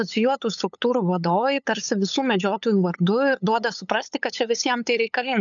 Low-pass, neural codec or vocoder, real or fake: 7.2 kHz; codec, 16 kHz, 8 kbps, FunCodec, trained on LibriTTS, 25 frames a second; fake